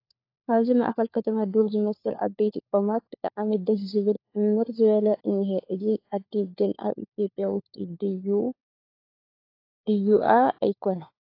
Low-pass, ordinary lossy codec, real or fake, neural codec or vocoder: 5.4 kHz; AAC, 32 kbps; fake; codec, 16 kHz, 4 kbps, FunCodec, trained on LibriTTS, 50 frames a second